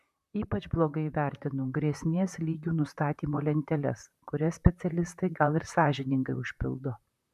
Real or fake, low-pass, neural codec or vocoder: fake; 14.4 kHz; vocoder, 44.1 kHz, 128 mel bands, Pupu-Vocoder